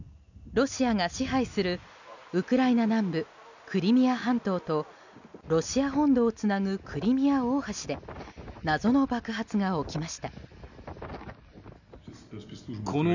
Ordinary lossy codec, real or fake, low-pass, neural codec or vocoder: none; real; 7.2 kHz; none